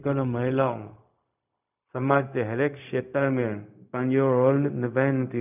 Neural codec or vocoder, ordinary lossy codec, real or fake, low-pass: codec, 16 kHz, 0.4 kbps, LongCat-Audio-Codec; none; fake; 3.6 kHz